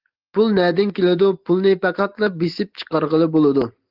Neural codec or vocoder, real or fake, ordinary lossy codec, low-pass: none; real; Opus, 16 kbps; 5.4 kHz